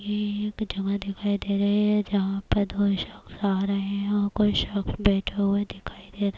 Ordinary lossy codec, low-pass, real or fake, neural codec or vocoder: none; none; real; none